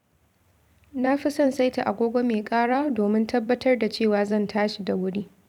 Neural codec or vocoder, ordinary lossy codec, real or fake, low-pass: vocoder, 44.1 kHz, 128 mel bands every 256 samples, BigVGAN v2; none; fake; 19.8 kHz